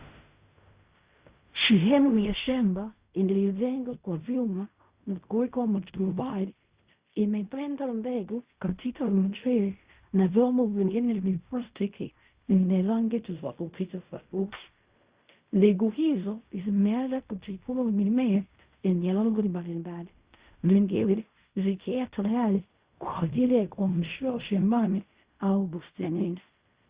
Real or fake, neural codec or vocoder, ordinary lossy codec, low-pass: fake; codec, 16 kHz in and 24 kHz out, 0.4 kbps, LongCat-Audio-Codec, fine tuned four codebook decoder; Opus, 64 kbps; 3.6 kHz